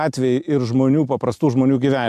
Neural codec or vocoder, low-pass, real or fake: none; 14.4 kHz; real